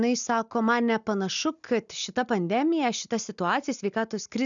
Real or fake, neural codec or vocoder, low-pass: real; none; 7.2 kHz